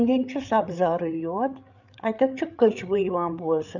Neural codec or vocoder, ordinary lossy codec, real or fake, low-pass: codec, 16 kHz, 8 kbps, FreqCodec, larger model; none; fake; 7.2 kHz